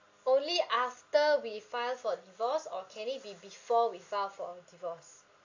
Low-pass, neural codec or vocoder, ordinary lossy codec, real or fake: 7.2 kHz; none; AAC, 48 kbps; real